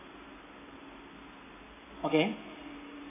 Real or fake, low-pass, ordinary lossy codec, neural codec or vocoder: real; 3.6 kHz; AAC, 24 kbps; none